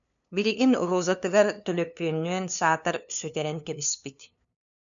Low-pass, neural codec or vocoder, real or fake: 7.2 kHz; codec, 16 kHz, 2 kbps, FunCodec, trained on LibriTTS, 25 frames a second; fake